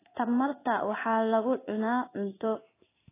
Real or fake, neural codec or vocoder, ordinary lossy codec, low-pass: fake; codec, 16 kHz in and 24 kHz out, 1 kbps, XY-Tokenizer; AAC, 24 kbps; 3.6 kHz